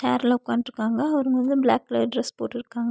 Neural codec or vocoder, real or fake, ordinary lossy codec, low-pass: none; real; none; none